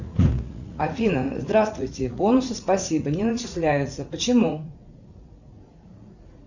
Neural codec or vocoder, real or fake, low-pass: vocoder, 44.1 kHz, 80 mel bands, Vocos; fake; 7.2 kHz